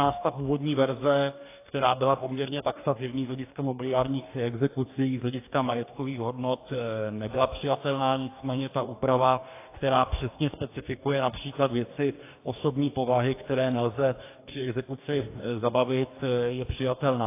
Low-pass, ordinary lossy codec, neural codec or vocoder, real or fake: 3.6 kHz; AAC, 24 kbps; codec, 44.1 kHz, 2.6 kbps, DAC; fake